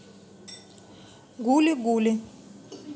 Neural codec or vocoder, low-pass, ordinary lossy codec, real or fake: none; none; none; real